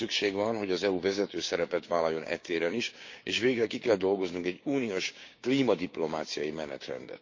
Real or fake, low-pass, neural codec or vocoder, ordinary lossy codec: fake; 7.2 kHz; codec, 16 kHz, 6 kbps, DAC; MP3, 48 kbps